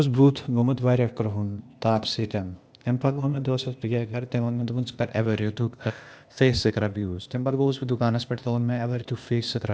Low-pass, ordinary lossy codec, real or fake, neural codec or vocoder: none; none; fake; codec, 16 kHz, 0.8 kbps, ZipCodec